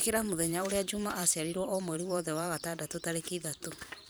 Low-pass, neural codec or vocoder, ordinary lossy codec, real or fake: none; vocoder, 44.1 kHz, 128 mel bands every 512 samples, BigVGAN v2; none; fake